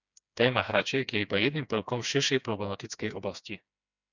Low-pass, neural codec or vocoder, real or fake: 7.2 kHz; codec, 16 kHz, 2 kbps, FreqCodec, smaller model; fake